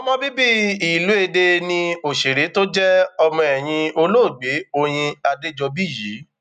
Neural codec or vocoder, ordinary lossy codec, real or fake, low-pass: none; none; real; 14.4 kHz